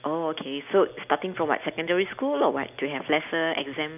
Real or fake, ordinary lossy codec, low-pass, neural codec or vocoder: real; none; 3.6 kHz; none